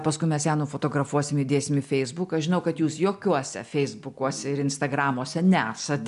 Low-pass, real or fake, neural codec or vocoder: 10.8 kHz; real; none